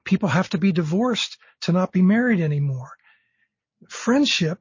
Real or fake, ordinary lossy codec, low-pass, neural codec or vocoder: real; MP3, 32 kbps; 7.2 kHz; none